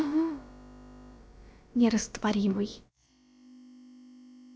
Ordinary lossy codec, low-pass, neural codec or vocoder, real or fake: none; none; codec, 16 kHz, about 1 kbps, DyCAST, with the encoder's durations; fake